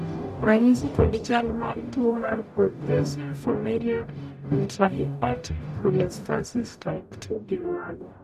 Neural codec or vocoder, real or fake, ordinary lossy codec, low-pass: codec, 44.1 kHz, 0.9 kbps, DAC; fake; none; 14.4 kHz